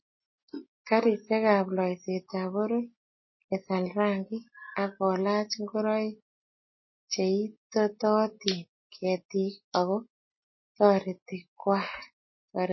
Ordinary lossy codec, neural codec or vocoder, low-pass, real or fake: MP3, 24 kbps; none; 7.2 kHz; real